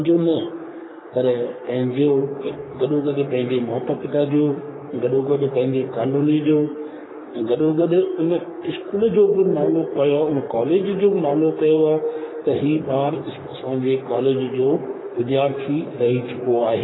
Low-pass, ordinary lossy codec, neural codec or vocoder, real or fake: 7.2 kHz; AAC, 16 kbps; codec, 44.1 kHz, 3.4 kbps, Pupu-Codec; fake